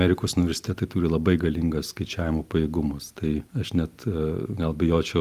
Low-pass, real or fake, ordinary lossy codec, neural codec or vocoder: 14.4 kHz; real; Opus, 32 kbps; none